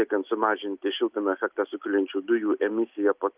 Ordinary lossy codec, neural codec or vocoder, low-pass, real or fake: Opus, 32 kbps; none; 3.6 kHz; real